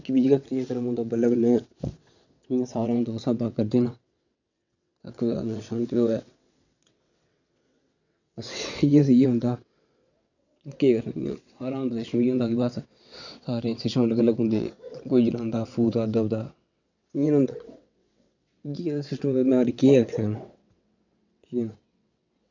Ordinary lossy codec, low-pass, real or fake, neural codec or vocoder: none; 7.2 kHz; fake; vocoder, 22.05 kHz, 80 mel bands, WaveNeXt